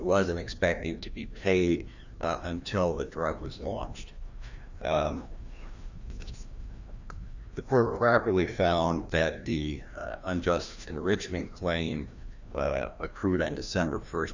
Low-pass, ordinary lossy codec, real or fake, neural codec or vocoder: 7.2 kHz; Opus, 64 kbps; fake; codec, 16 kHz, 1 kbps, FreqCodec, larger model